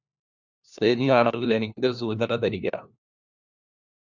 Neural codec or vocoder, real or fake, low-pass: codec, 16 kHz, 1 kbps, FunCodec, trained on LibriTTS, 50 frames a second; fake; 7.2 kHz